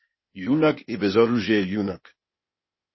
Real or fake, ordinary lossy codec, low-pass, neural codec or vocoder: fake; MP3, 24 kbps; 7.2 kHz; codec, 16 kHz, 0.8 kbps, ZipCodec